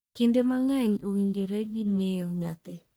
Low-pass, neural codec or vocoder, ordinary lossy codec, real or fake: none; codec, 44.1 kHz, 1.7 kbps, Pupu-Codec; none; fake